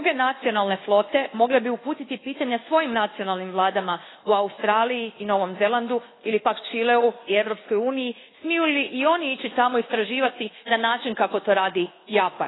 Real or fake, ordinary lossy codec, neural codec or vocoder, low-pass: fake; AAC, 16 kbps; codec, 24 kHz, 1.2 kbps, DualCodec; 7.2 kHz